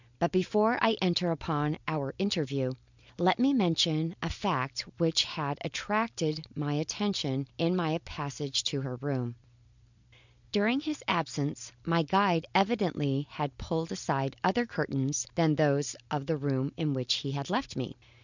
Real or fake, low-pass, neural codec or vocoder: real; 7.2 kHz; none